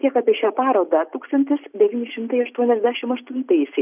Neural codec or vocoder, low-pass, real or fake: none; 3.6 kHz; real